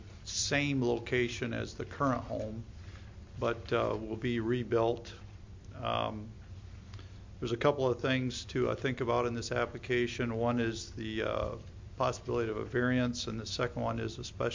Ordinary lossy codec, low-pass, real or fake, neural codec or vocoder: MP3, 48 kbps; 7.2 kHz; real; none